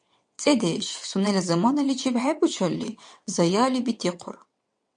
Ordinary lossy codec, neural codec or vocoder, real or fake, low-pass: MP3, 64 kbps; vocoder, 22.05 kHz, 80 mel bands, WaveNeXt; fake; 9.9 kHz